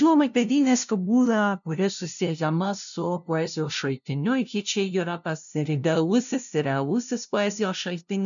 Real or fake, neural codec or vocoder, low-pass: fake; codec, 16 kHz, 0.5 kbps, FunCodec, trained on LibriTTS, 25 frames a second; 7.2 kHz